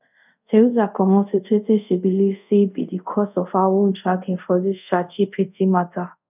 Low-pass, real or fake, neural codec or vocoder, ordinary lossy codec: 3.6 kHz; fake; codec, 24 kHz, 0.5 kbps, DualCodec; none